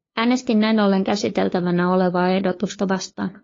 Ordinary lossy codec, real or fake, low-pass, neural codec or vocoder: AAC, 32 kbps; fake; 7.2 kHz; codec, 16 kHz, 2 kbps, FunCodec, trained on LibriTTS, 25 frames a second